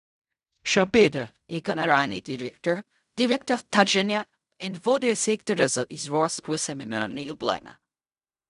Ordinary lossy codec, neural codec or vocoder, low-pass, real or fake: none; codec, 16 kHz in and 24 kHz out, 0.4 kbps, LongCat-Audio-Codec, fine tuned four codebook decoder; 10.8 kHz; fake